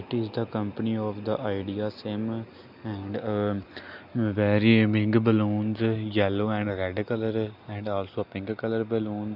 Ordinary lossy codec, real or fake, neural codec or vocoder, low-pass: none; real; none; 5.4 kHz